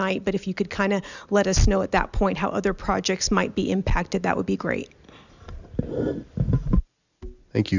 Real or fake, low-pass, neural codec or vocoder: real; 7.2 kHz; none